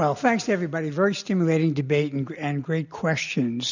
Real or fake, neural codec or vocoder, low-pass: real; none; 7.2 kHz